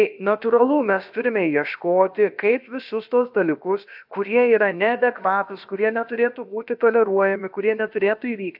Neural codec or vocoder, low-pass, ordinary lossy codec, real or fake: codec, 16 kHz, about 1 kbps, DyCAST, with the encoder's durations; 5.4 kHz; AAC, 48 kbps; fake